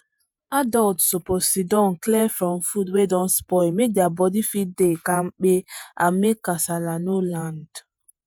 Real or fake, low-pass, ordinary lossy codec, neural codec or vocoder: fake; none; none; vocoder, 48 kHz, 128 mel bands, Vocos